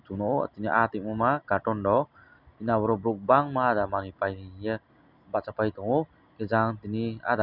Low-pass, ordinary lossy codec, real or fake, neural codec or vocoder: 5.4 kHz; none; real; none